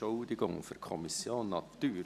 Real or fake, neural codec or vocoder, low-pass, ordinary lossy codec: real; none; 14.4 kHz; none